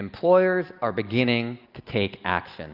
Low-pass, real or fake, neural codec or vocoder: 5.4 kHz; real; none